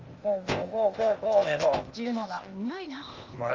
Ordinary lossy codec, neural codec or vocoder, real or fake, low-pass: Opus, 32 kbps; codec, 16 kHz, 0.8 kbps, ZipCodec; fake; 7.2 kHz